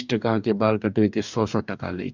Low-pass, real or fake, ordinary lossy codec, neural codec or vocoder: 7.2 kHz; fake; none; codec, 44.1 kHz, 2.6 kbps, DAC